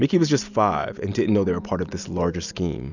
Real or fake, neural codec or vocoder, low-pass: real; none; 7.2 kHz